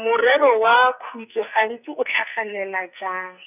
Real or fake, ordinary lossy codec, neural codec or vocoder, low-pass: fake; none; codec, 44.1 kHz, 2.6 kbps, SNAC; 3.6 kHz